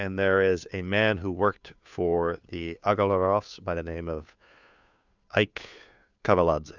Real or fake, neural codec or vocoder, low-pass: fake; codec, 16 kHz, 2 kbps, FunCodec, trained on Chinese and English, 25 frames a second; 7.2 kHz